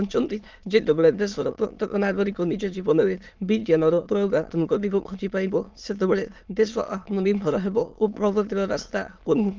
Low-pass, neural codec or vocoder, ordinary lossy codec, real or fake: 7.2 kHz; autoencoder, 22.05 kHz, a latent of 192 numbers a frame, VITS, trained on many speakers; Opus, 24 kbps; fake